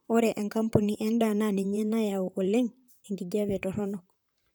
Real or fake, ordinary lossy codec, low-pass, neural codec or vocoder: fake; none; none; vocoder, 44.1 kHz, 128 mel bands, Pupu-Vocoder